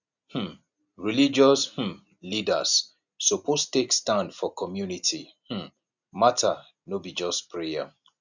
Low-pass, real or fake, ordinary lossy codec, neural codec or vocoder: 7.2 kHz; real; none; none